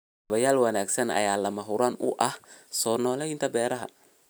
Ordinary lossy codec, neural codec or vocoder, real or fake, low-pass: none; none; real; none